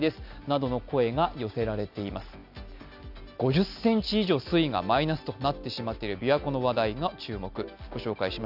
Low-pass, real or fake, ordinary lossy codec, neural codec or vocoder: 5.4 kHz; real; none; none